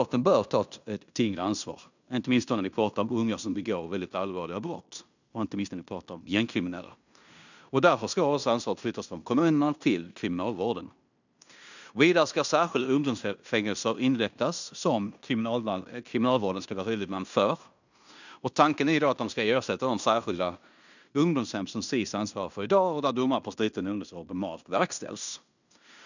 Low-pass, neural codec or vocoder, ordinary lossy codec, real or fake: 7.2 kHz; codec, 16 kHz in and 24 kHz out, 0.9 kbps, LongCat-Audio-Codec, fine tuned four codebook decoder; none; fake